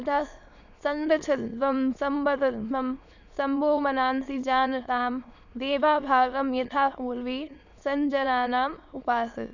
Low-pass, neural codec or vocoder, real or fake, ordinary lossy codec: 7.2 kHz; autoencoder, 22.05 kHz, a latent of 192 numbers a frame, VITS, trained on many speakers; fake; none